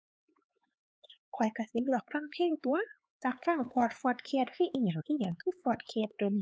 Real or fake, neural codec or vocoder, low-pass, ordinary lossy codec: fake; codec, 16 kHz, 4 kbps, X-Codec, HuBERT features, trained on LibriSpeech; none; none